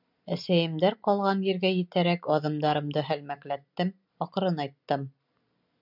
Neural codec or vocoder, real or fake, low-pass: none; real; 5.4 kHz